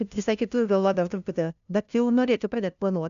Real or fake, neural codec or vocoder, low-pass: fake; codec, 16 kHz, 0.5 kbps, FunCodec, trained on LibriTTS, 25 frames a second; 7.2 kHz